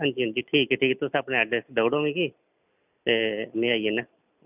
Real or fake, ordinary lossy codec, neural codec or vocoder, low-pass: real; none; none; 3.6 kHz